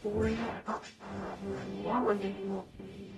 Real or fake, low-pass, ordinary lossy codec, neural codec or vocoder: fake; 19.8 kHz; AAC, 32 kbps; codec, 44.1 kHz, 0.9 kbps, DAC